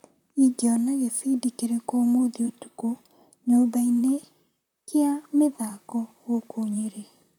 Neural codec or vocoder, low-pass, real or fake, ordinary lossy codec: vocoder, 44.1 kHz, 128 mel bands, Pupu-Vocoder; 19.8 kHz; fake; none